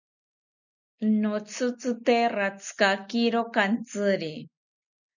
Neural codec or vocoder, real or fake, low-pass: none; real; 7.2 kHz